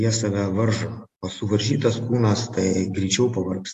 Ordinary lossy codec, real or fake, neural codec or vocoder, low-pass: AAC, 64 kbps; real; none; 14.4 kHz